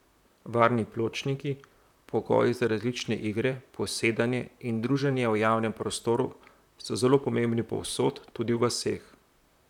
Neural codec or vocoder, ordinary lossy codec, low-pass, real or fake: vocoder, 44.1 kHz, 128 mel bands, Pupu-Vocoder; none; 19.8 kHz; fake